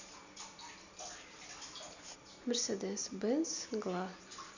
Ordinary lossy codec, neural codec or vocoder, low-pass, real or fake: none; none; 7.2 kHz; real